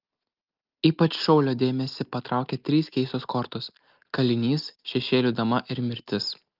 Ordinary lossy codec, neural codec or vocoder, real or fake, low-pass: Opus, 32 kbps; none; real; 5.4 kHz